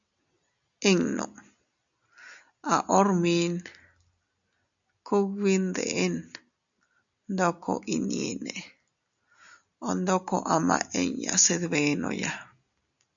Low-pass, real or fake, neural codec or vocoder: 7.2 kHz; real; none